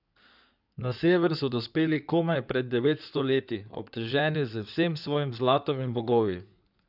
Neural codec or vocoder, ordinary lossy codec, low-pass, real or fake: codec, 16 kHz in and 24 kHz out, 2.2 kbps, FireRedTTS-2 codec; none; 5.4 kHz; fake